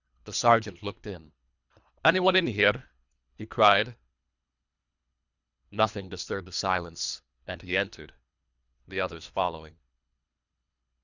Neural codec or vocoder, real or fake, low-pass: codec, 24 kHz, 3 kbps, HILCodec; fake; 7.2 kHz